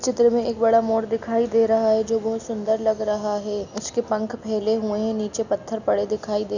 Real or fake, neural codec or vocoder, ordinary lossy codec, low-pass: real; none; none; 7.2 kHz